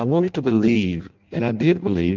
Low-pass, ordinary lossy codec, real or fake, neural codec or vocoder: 7.2 kHz; Opus, 24 kbps; fake; codec, 16 kHz in and 24 kHz out, 0.6 kbps, FireRedTTS-2 codec